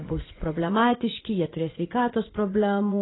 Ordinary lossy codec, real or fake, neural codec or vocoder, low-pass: AAC, 16 kbps; fake; vocoder, 44.1 kHz, 80 mel bands, Vocos; 7.2 kHz